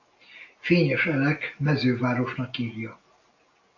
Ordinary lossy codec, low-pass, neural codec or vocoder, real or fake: AAC, 48 kbps; 7.2 kHz; none; real